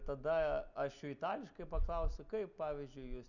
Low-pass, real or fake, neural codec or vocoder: 7.2 kHz; real; none